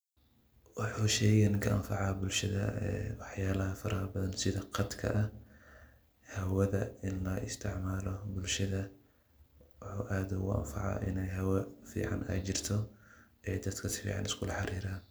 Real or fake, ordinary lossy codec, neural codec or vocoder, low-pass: real; none; none; none